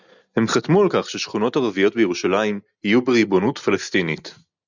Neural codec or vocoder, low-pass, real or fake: none; 7.2 kHz; real